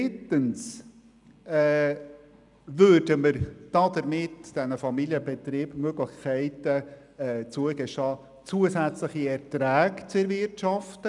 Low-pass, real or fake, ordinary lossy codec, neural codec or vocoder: 10.8 kHz; real; none; none